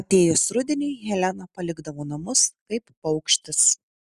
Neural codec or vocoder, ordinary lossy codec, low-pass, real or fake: none; Opus, 64 kbps; 14.4 kHz; real